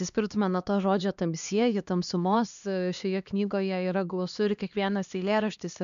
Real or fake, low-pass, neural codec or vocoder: fake; 7.2 kHz; codec, 16 kHz, 2 kbps, X-Codec, HuBERT features, trained on LibriSpeech